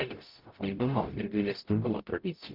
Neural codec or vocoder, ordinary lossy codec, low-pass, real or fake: codec, 44.1 kHz, 0.9 kbps, DAC; Opus, 32 kbps; 5.4 kHz; fake